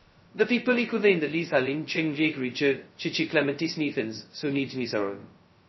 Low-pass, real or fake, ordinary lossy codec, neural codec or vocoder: 7.2 kHz; fake; MP3, 24 kbps; codec, 16 kHz, 0.2 kbps, FocalCodec